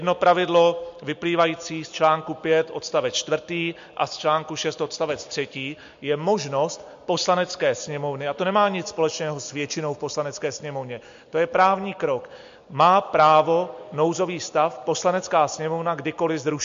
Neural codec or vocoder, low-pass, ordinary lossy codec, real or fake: none; 7.2 kHz; MP3, 48 kbps; real